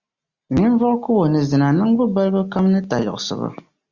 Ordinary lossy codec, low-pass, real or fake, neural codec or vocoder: Opus, 64 kbps; 7.2 kHz; real; none